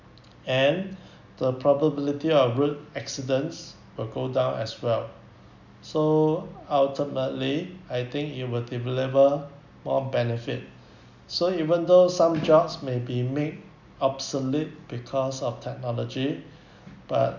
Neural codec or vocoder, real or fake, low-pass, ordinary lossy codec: none; real; 7.2 kHz; none